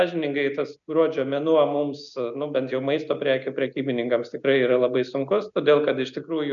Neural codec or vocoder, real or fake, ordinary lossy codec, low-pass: none; real; MP3, 96 kbps; 7.2 kHz